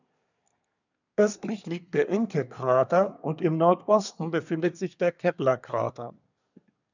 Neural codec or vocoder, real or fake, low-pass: codec, 24 kHz, 1 kbps, SNAC; fake; 7.2 kHz